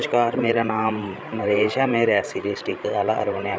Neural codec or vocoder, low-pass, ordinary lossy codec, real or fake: codec, 16 kHz, 16 kbps, FreqCodec, larger model; none; none; fake